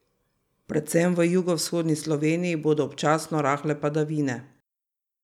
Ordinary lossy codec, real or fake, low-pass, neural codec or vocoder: none; real; 19.8 kHz; none